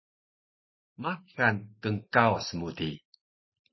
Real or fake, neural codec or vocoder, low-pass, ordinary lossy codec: real; none; 7.2 kHz; MP3, 24 kbps